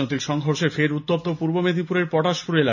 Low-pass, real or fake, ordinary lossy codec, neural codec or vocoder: 7.2 kHz; real; none; none